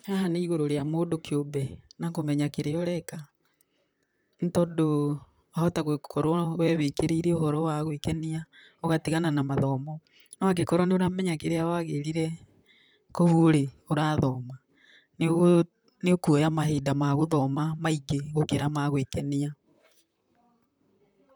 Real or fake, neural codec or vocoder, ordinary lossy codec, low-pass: fake; vocoder, 44.1 kHz, 128 mel bands, Pupu-Vocoder; none; none